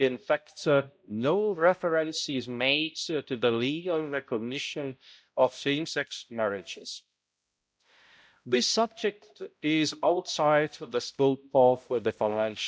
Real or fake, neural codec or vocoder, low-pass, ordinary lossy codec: fake; codec, 16 kHz, 0.5 kbps, X-Codec, HuBERT features, trained on balanced general audio; none; none